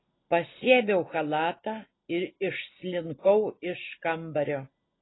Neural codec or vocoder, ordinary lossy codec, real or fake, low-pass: none; AAC, 16 kbps; real; 7.2 kHz